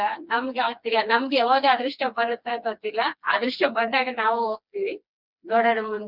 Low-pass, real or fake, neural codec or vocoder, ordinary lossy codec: 5.4 kHz; fake; codec, 16 kHz, 2 kbps, FreqCodec, smaller model; none